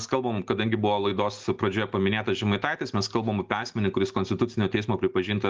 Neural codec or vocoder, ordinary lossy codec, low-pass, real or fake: none; Opus, 32 kbps; 7.2 kHz; real